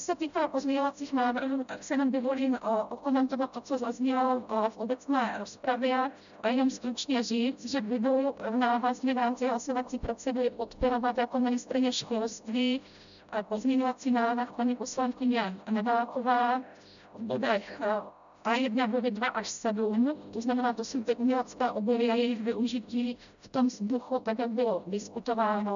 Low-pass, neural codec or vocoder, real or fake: 7.2 kHz; codec, 16 kHz, 0.5 kbps, FreqCodec, smaller model; fake